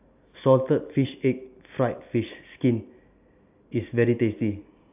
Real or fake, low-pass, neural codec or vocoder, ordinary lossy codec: real; 3.6 kHz; none; AAC, 32 kbps